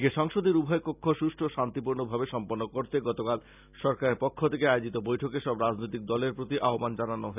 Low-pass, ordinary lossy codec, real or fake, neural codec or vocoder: 3.6 kHz; none; real; none